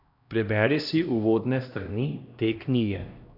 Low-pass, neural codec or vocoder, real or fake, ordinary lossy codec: 5.4 kHz; codec, 16 kHz, 1 kbps, X-Codec, HuBERT features, trained on LibriSpeech; fake; none